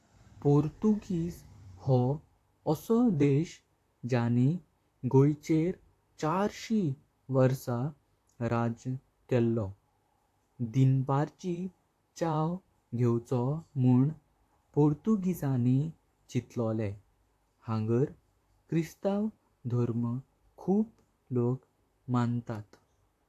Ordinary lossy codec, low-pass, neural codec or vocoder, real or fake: AAC, 64 kbps; 14.4 kHz; vocoder, 44.1 kHz, 128 mel bands, Pupu-Vocoder; fake